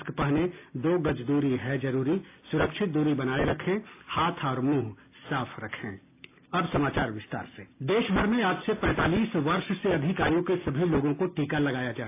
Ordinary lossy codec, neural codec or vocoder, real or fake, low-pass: AAC, 24 kbps; none; real; 3.6 kHz